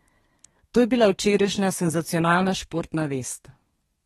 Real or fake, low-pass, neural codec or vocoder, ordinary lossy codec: fake; 14.4 kHz; codec, 32 kHz, 1.9 kbps, SNAC; AAC, 32 kbps